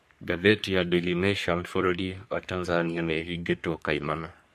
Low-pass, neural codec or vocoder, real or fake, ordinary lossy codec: 14.4 kHz; codec, 44.1 kHz, 3.4 kbps, Pupu-Codec; fake; MP3, 64 kbps